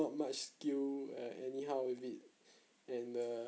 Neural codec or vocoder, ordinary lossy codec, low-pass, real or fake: none; none; none; real